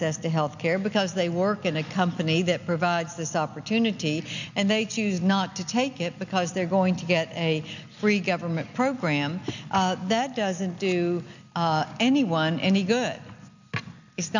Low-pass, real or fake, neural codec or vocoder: 7.2 kHz; real; none